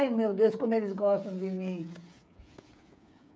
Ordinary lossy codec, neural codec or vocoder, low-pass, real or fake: none; codec, 16 kHz, 8 kbps, FreqCodec, smaller model; none; fake